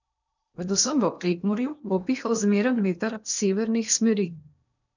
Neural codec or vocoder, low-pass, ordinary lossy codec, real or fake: codec, 16 kHz in and 24 kHz out, 0.8 kbps, FocalCodec, streaming, 65536 codes; 7.2 kHz; none; fake